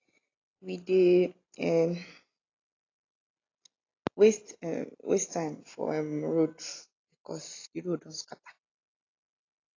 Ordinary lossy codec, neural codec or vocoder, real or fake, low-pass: AAC, 32 kbps; none; real; 7.2 kHz